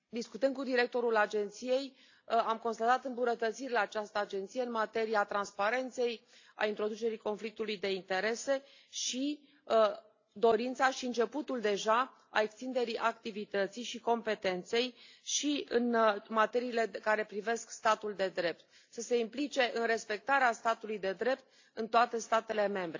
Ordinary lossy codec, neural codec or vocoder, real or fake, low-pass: AAC, 48 kbps; none; real; 7.2 kHz